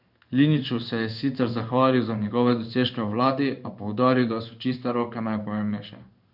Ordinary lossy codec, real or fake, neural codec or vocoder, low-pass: none; fake; codec, 16 kHz in and 24 kHz out, 1 kbps, XY-Tokenizer; 5.4 kHz